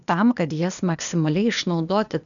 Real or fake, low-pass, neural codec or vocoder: fake; 7.2 kHz; codec, 16 kHz, 0.8 kbps, ZipCodec